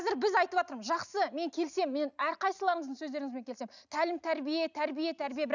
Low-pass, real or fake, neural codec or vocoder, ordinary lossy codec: 7.2 kHz; real; none; none